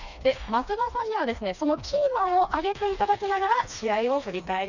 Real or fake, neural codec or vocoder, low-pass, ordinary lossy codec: fake; codec, 16 kHz, 2 kbps, FreqCodec, smaller model; 7.2 kHz; none